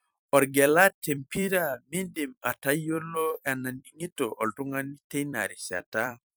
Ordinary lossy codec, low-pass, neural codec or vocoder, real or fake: none; none; vocoder, 44.1 kHz, 128 mel bands every 256 samples, BigVGAN v2; fake